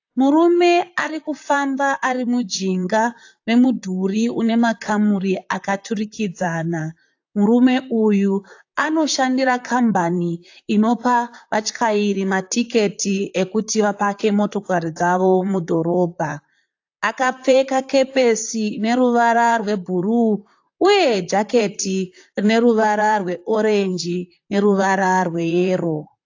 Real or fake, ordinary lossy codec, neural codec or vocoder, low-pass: fake; AAC, 48 kbps; vocoder, 44.1 kHz, 128 mel bands, Pupu-Vocoder; 7.2 kHz